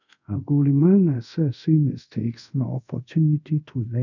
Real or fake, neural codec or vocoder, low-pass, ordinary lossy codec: fake; codec, 24 kHz, 0.5 kbps, DualCodec; 7.2 kHz; none